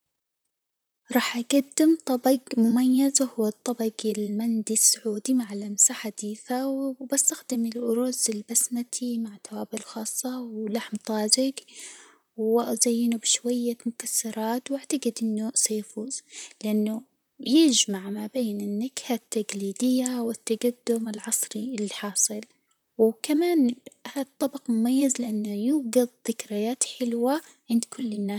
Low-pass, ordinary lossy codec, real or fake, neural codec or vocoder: none; none; fake; vocoder, 44.1 kHz, 128 mel bands, Pupu-Vocoder